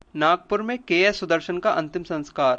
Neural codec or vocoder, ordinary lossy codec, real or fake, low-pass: none; MP3, 96 kbps; real; 9.9 kHz